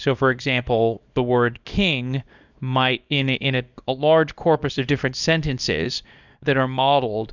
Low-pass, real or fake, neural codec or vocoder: 7.2 kHz; fake; codec, 24 kHz, 0.9 kbps, WavTokenizer, small release